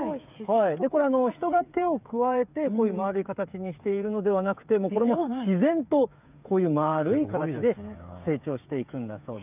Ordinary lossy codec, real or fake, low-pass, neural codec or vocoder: none; fake; 3.6 kHz; codec, 16 kHz, 8 kbps, FreqCodec, smaller model